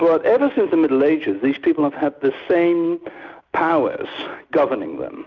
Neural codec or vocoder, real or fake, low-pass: none; real; 7.2 kHz